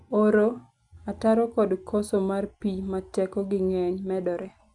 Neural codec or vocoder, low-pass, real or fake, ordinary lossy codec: none; 10.8 kHz; real; MP3, 96 kbps